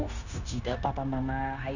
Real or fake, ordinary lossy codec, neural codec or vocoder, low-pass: fake; none; codec, 16 kHz, 0.9 kbps, LongCat-Audio-Codec; 7.2 kHz